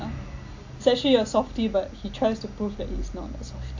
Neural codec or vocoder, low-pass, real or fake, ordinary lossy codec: none; 7.2 kHz; real; AAC, 48 kbps